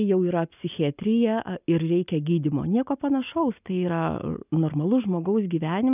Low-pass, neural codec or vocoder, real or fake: 3.6 kHz; none; real